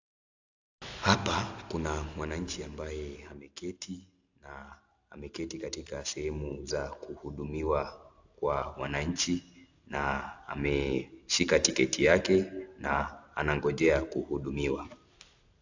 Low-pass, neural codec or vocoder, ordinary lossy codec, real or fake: 7.2 kHz; none; AAC, 48 kbps; real